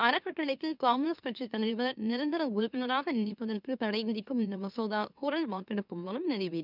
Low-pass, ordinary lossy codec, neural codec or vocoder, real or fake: 5.4 kHz; none; autoencoder, 44.1 kHz, a latent of 192 numbers a frame, MeloTTS; fake